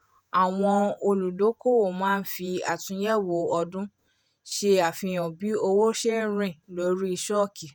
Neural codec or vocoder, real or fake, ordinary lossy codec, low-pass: vocoder, 48 kHz, 128 mel bands, Vocos; fake; none; none